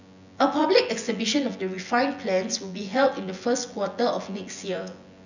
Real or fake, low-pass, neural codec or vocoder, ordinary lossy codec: fake; 7.2 kHz; vocoder, 24 kHz, 100 mel bands, Vocos; none